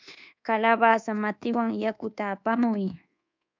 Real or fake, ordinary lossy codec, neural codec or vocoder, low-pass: fake; MP3, 64 kbps; codec, 24 kHz, 1.2 kbps, DualCodec; 7.2 kHz